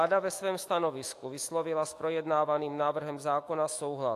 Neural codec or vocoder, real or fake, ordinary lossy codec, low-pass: autoencoder, 48 kHz, 128 numbers a frame, DAC-VAE, trained on Japanese speech; fake; AAC, 64 kbps; 14.4 kHz